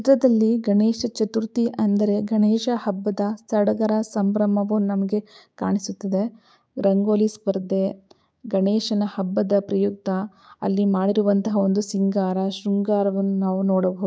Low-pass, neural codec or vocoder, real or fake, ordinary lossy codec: none; codec, 16 kHz, 6 kbps, DAC; fake; none